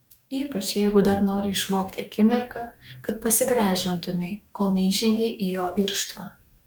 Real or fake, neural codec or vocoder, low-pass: fake; codec, 44.1 kHz, 2.6 kbps, DAC; 19.8 kHz